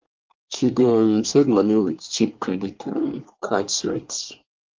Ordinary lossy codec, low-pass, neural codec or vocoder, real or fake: Opus, 32 kbps; 7.2 kHz; codec, 24 kHz, 1 kbps, SNAC; fake